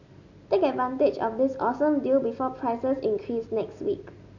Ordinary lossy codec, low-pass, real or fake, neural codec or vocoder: none; 7.2 kHz; real; none